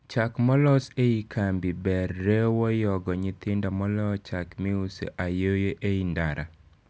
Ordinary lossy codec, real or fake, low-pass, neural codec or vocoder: none; real; none; none